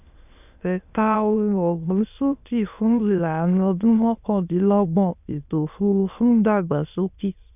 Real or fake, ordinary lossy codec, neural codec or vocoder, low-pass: fake; none; autoencoder, 22.05 kHz, a latent of 192 numbers a frame, VITS, trained on many speakers; 3.6 kHz